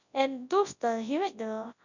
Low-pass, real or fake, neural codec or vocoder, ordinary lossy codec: 7.2 kHz; fake; codec, 24 kHz, 0.9 kbps, WavTokenizer, large speech release; none